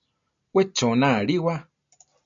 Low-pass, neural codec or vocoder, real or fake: 7.2 kHz; none; real